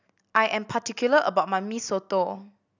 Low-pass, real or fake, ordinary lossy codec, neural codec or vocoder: 7.2 kHz; real; none; none